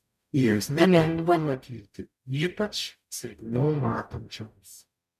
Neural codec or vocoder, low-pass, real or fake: codec, 44.1 kHz, 0.9 kbps, DAC; 14.4 kHz; fake